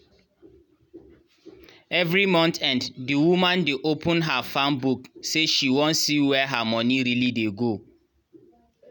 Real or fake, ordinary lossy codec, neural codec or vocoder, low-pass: real; none; none; 19.8 kHz